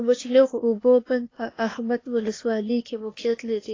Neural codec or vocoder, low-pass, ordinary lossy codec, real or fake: codec, 16 kHz, 0.8 kbps, ZipCodec; 7.2 kHz; AAC, 32 kbps; fake